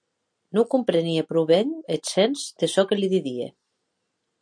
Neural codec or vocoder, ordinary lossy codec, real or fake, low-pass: none; MP3, 64 kbps; real; 9.9 kHz